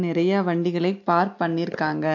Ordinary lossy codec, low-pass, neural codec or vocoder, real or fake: AAC, 48 kbps; 7.2 kHz; none; real